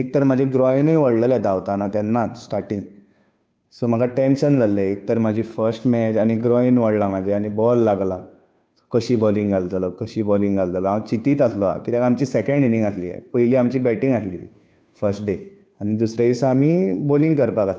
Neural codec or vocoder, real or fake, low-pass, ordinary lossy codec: codec, 16 kHz, 2 kbps, FunCodec, trained on Chinese and English, 25 frames a second; fake; none; none